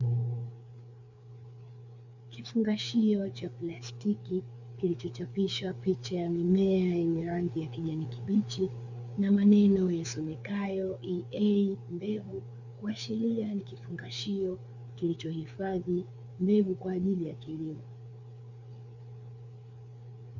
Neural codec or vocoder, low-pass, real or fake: codec, 16 kHz, 4 kbps, FreqCodec, larger model; 7.2 kHz; fake